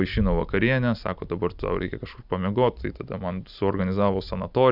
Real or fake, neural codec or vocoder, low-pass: real; none; 5.4 kHz